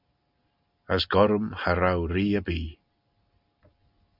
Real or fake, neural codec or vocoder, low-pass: real; none; 5.4 kHz